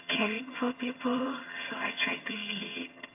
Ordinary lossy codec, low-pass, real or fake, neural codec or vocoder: AAC, 24 kbps; 3.6 kHz; fake; vocoder, 22.05 kHz, 80 mel bands, HiFi-GAN